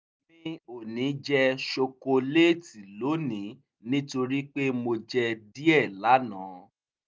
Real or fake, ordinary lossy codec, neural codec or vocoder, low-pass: real; none; none; none